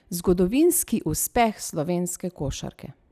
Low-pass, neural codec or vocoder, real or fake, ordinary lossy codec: 14.4 kHz; none; real; none